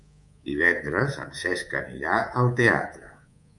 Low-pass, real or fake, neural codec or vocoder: 10.8 kHz; fake; codec, 24 kHz, 3.1 kbps, DualCodec